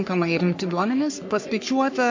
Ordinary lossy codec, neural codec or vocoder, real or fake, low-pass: MP3, 48 kbps; codec, 44.1 kHz, 3.4 kbps, Pupu-Codec; fake; 7.2 kHz